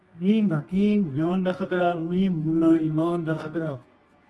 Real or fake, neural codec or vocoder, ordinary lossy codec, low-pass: fake; codec, 24 kHz, 0.9 kbps, WavTokenizer, medium music audio release; Opus, 32 kbps; 10.8 kHz